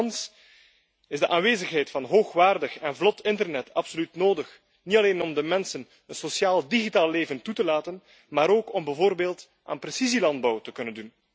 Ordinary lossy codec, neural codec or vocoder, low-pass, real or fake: none; none; none; real